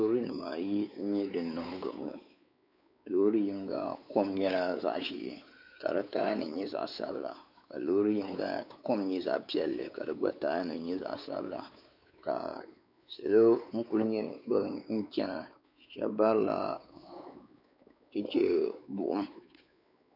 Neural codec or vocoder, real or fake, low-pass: codec, 16 kHz, 4 kbps, X-Codec, WavLM features, trained on Multilingual LibriSpeech; fake; 5.4 kHz